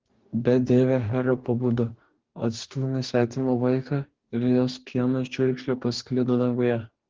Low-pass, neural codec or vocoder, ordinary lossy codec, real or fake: 7.2 kHz; codec, 44.1 kHz, 2.6 kbps, DAC; Opus, 16 kbps; fake